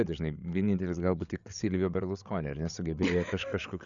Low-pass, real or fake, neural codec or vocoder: 7.2 kHz; fake; codec, 16 kHz, 8 kbps, FreqCodec, larger model